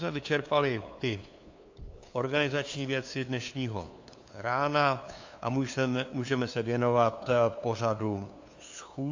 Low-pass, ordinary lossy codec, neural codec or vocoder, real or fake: 7.2 kHz; AAC, 48 kbps; codec, 16 kHz, 2 kbps, FunCodec, trained on LibriTTS, 25 frames a second; fake